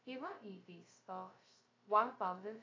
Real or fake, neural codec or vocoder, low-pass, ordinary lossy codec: fake; codec, 16 kHz, 0.2 kbps, FocalCodec; 7.2 kHz; AAC, 32 kbps